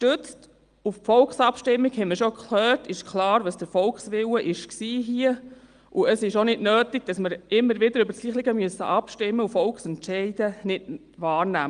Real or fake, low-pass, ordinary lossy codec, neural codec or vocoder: real; 9.9 kHz; Opus, 32 kbps; none